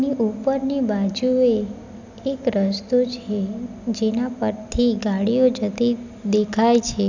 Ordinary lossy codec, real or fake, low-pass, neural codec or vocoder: none; real; 7.2 kHz; none